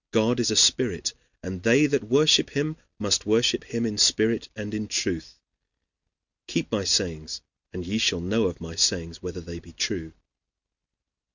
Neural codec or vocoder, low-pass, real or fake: none; 7.2 kHz; real